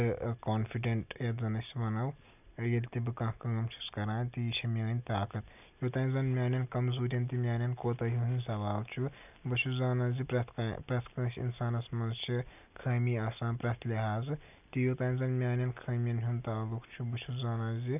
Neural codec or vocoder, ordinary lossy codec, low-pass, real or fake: none; none; 3.6 kHz; real